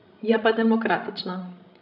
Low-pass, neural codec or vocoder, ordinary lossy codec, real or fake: 5.4 kHz; codec, 16 kHz, 16 kbps, FreqCodec, larger model; none; fake